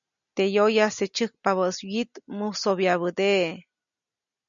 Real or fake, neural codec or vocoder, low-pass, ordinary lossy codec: real; none; 7.2 kHz; MP3, 96 kbps